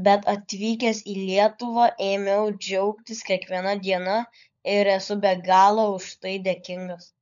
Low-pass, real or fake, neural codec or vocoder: 7.2 kHz; fake; codec, 16 kHz, 16 kbps, FunCodec, trained on LibriTTS, 50 frames a second